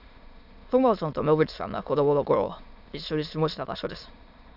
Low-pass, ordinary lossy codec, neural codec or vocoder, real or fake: 5.4 kHz; AAC, 48 kbps; autoencoder, 22.05 kHz, a latent of 192 numbers a frame, VITS, trained on many speakers; fake